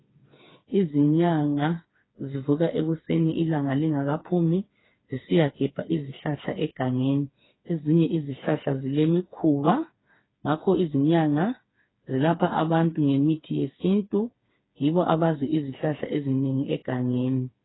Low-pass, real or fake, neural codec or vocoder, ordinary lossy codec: 7.2 kHz; fake; codec, 16 kHz, 4 kbps, FreqCodec, smaller model; AAC, 16 kbps